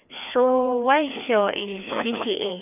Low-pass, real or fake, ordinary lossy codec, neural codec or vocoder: 3.6 kHz; fake; none; codec, 16 kHz, 2 kbps, FreqCodec, larger model